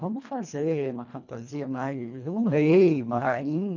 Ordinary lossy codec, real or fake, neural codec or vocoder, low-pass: none; fake; codec, 24 kHz, 1.5 kbps, HILCodec; 7.2 kHz